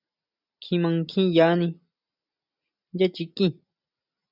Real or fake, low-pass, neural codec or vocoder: real; 5.4 kHz; none